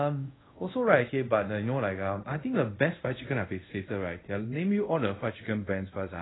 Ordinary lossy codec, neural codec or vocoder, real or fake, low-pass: AAC, 16 kbps; codec, 24 kHz, 0.5 kbps, DualCodec; fake; 7.2 kHz